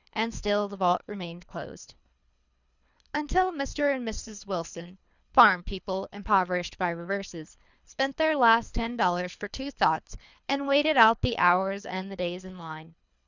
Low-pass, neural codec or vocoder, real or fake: 7.2 kHz; codec, 24 kHz, 3 kbps, HILCodec; fake